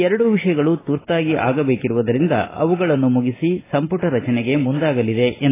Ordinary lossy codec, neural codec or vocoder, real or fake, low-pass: AAC, 16 kbps; none; real; 3.6 kHz